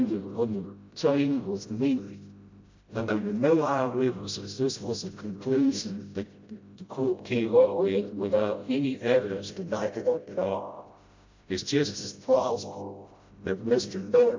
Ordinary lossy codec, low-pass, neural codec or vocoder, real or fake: MP3, 48 kbps; 7.2 kHz; codec, 16 kHz, 0.5 kbps, FreqCodec, smaller model; fake